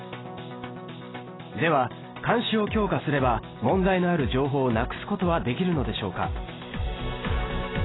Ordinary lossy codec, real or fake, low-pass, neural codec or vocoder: AAC, 16 kbps; real; 7.2 kHz; none